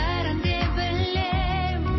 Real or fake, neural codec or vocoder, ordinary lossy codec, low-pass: real; none; MP3, 24 kbps; 7.2 kHz